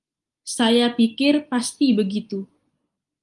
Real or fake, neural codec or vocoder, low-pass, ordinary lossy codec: real; none; 10.8 kHz; Opus, 32 kbps